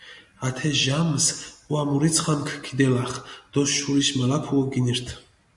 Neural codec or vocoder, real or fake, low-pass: none; real; 10.8 kHz